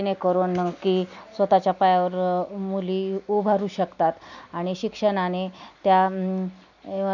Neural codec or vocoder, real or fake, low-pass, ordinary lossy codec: none; real; 7.2 kHz; none